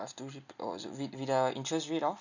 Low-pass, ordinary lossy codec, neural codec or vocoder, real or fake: 7.2 kHz; none; none; real